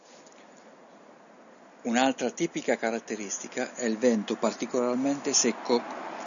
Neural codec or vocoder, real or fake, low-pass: none; real; 7.2 kHz